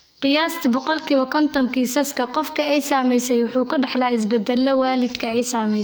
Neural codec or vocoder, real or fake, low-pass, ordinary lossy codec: codec, 44.1 kHz, 2.6 kbps, SNAC; fake; none; none